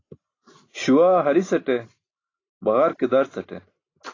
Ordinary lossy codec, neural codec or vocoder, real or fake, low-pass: AAC, 32 kbps; none; real; 7.2 kHz